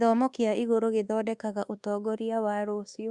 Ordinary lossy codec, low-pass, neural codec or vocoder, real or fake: Opus, 64 kbps; 10.8 kHz; codec, 24 kHz, 1.2 kbps, DualCodec; fake